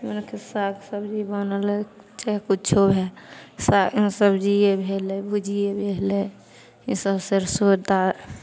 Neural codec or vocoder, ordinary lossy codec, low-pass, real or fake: none; none; none; real